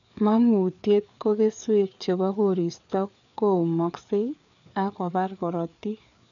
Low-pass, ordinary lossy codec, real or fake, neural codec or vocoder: 7.2 kHz; none; fake; codec, 16 kHz, 4 kbps, FreqCodec, larger model